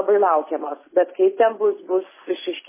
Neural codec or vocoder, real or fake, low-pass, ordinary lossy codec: none; real; 3.6 kHz; MP3, 16 kbps